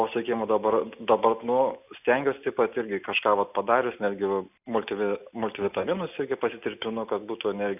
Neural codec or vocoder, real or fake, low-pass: none; real; 3.6 kHz